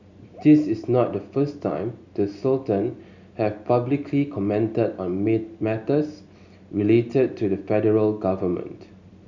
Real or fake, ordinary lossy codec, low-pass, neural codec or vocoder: real; none; 7.2 kHz; none